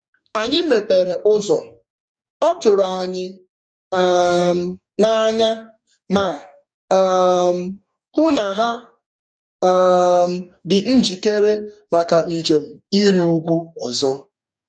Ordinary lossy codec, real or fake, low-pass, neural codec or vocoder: none; fake; 9.9 kHz; codec, 44.1 kHz, 2.6 kbps, DAC